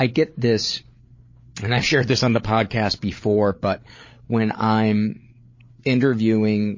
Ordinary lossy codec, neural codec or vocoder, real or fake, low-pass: MP3, 32 kbps; codec, 16 kHz, 8 kbps, FreqCodec, larger model; fake; 7.2 kHz